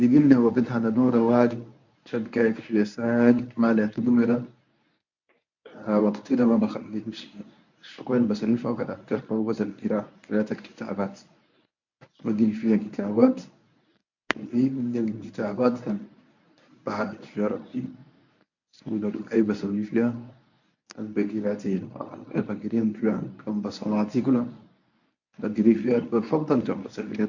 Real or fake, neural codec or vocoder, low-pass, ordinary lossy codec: fake; codec, 24 kHz, 0.9 kbps, WavTokenizer, medium speech release version 1; 7.2 kHz; none